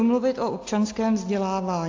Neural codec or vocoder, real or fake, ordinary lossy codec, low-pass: none; real; AAC, 48 kbps; 7.2 kHz